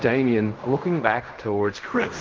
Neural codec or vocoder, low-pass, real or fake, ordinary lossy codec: codec, 16 kHz in and 24 kHz out, 0.4 kbps, LongCat-Audio-Codec, fine tuned four codebook decoder; 7.2 kHz; fake; Opus, 24 kbps